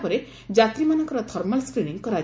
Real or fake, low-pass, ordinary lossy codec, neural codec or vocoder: real; none; none; none